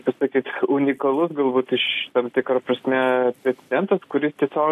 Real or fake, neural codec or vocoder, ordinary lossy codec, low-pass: real; none; AAC, 64 kbps; 14.4 kHz